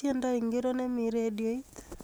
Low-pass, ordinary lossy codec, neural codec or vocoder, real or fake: none; none; none; real